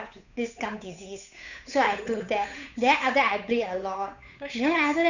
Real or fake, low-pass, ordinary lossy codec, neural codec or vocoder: fake; 7.2 kHz; none; codec, 16 kHz, 8 kbps, FunCodec, trained on Chinese and English, 25 frames a second